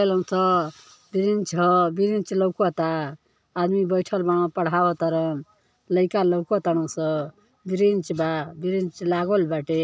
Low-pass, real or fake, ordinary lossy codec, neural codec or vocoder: none; real; none; none